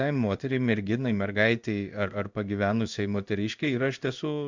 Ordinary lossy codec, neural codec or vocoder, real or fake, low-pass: Opus, 64 kbps; codec, 16 kHz in and 24 kHz out, 1 kbps, XY-Tokenizer; fake; 7.2 kHz